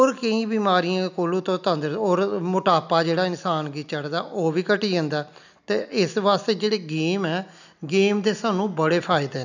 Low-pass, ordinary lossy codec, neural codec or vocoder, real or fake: 7.2 kHz; none; none; real